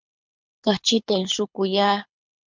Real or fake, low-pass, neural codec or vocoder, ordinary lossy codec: fake; 7.2 kHz; codec, 24 kHz, 6 kbps, HILCodec; MP3, 64 kbps